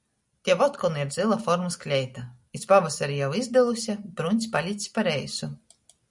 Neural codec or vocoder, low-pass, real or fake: none; 10.8 kHz; real